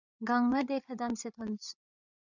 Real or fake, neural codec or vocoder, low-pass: fake; codec, 16 kHz, 8 kbps, FreqCodec, larger model; 7.2 kHz